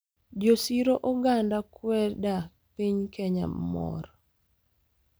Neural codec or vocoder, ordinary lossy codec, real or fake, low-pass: none; none; real; none